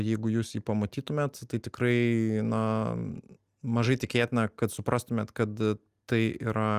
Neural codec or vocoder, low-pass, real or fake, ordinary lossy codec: none; 14.4 kHz; real; Opus, 32 kbps